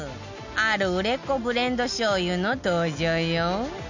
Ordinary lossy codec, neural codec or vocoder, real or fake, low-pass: none; none; real; 7.2 kHz